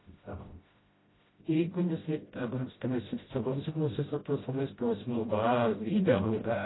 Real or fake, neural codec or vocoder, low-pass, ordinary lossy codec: fake; codec, 16 kHz, 0.5 kbps, FreqCodec, smaller model; 7.2 kHz; AAC, 16 kbps